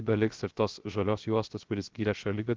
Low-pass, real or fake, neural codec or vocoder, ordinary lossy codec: 7.2 kHz; fake; codec, 16 kHz, 0.3 kbps, FocalCodec; Opus, 16 kbps